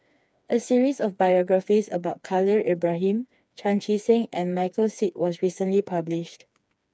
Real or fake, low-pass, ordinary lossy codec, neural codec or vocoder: fake; none; none; codec, 16 kHz, 4 kbps, FreqCodec, smaller model